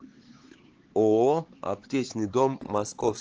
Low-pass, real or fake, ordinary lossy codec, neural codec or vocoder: 7.2 kHz; fake; Opus, 16 kbps; codec, 16 kHz, 4 kbps, X-Codec, HuBERT features, trained on LibriSpeech